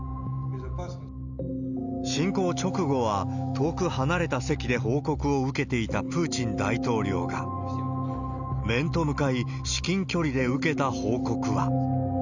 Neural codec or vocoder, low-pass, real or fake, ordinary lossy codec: none; 7.2 kHz; real; none